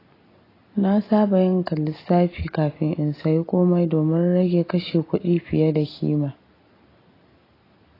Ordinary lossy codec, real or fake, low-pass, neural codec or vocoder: AAC, 24 kbps; real; 5.4 kHz; none